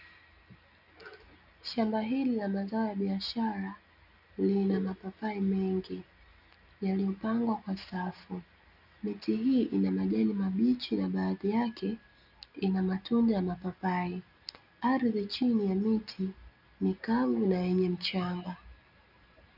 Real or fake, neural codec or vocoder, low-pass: real; none; 5.4 kHz